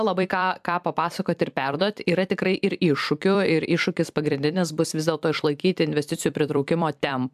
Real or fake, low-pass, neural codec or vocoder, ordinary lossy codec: fake; 14.4 kHz; vocoder, 44.1 kHz, 128 mel bands every 256 samples, BigVGAN v2; AAC, 96 kbps